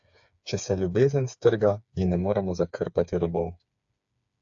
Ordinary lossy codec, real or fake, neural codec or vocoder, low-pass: AAC, 64 kbps; fake; codec, 16 kHz, 4 kbps, FreqCodec, smaller model; 7.2 kHz